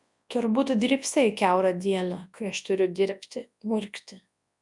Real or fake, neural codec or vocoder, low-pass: fake; codec, 24 kHz, 0.9 kbps, WavTokenizer, large speech release; 10.8 kHz